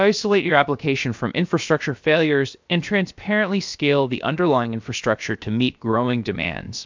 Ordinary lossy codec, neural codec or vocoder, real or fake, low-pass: MP3, 64 kbps; codec, 16 kHz, about 1 kbps, DyCAST, with the encoder's durations; fake; 7.2 kHz